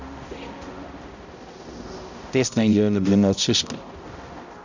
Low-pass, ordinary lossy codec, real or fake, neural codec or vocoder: 7.2 kHz; none; fake; codec, 16 kHz, 0.5 kbps, X-Codec, HuBERT features, trained on balanced general audio